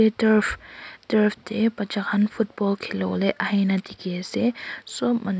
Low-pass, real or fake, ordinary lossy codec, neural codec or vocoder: none; real; none; none